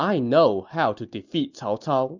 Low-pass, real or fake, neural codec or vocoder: 7.2 kHz; real; none